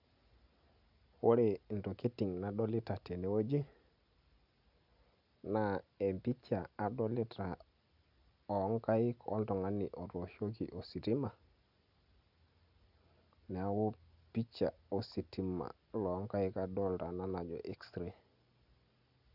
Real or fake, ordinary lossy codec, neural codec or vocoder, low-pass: real; none; none; 5.4 kHz